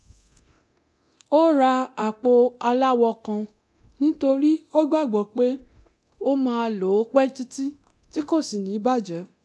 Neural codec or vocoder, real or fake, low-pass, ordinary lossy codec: codec, 24 kHz, 0.9 kbps, DualCodec; fake; none; none